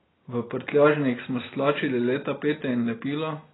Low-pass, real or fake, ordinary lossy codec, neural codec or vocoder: 7.2 kHz; real; AAC, 16 kbps; none